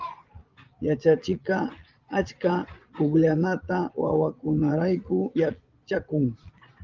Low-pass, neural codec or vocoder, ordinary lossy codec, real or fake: 7.2 kHz; vocoder, 22.05 kHz, 80 mel bands, Vocos; Opus, 32 kbps; fake